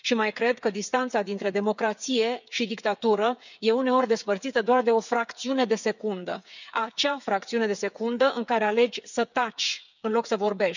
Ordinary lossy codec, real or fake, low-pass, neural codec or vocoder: none; fake; 7.2 kHz; codec, 16 kHz, 8 kbps, FreqCodec, smaller model